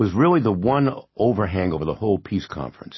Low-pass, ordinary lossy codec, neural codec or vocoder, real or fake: 7.2 kHz; MP3, 24 kbps; none; real